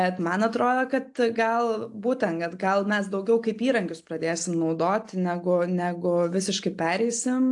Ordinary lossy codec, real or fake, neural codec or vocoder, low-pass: AAC, 64 kbps; real; none; 10.8 kHz